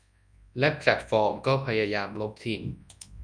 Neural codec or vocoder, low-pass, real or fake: codec, 24 kHz, 0.9 kbps, WavTokenizer, large speech release; 9.9 kHz; fake